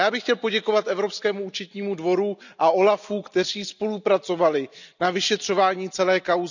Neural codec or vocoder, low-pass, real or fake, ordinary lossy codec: none; 7.2 kHz; real; none